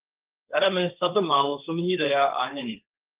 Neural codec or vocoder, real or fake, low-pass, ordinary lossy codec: codec, 16 kHz, 1.1 kbps, Voila-Tokenizer; fake; 3.6 kHz; Opus, 32 kbps